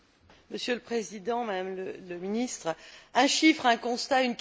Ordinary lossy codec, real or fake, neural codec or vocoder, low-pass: none; real; none; none